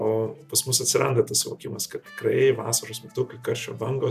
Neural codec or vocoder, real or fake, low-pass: none; real; 14.4 kHz